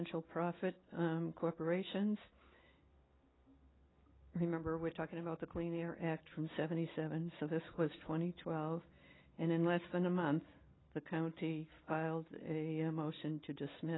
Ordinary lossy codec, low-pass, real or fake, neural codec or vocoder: AAC, 16 kbps; 7.2 kHz; real; none